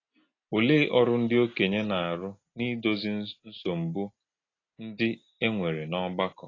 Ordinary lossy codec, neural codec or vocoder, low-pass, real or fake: AAC, 32 kbps; none; 7.2 kHz; real